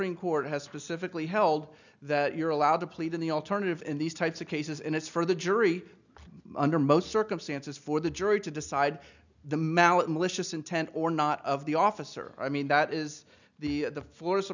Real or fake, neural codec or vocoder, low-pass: real; none; 7.2 kHz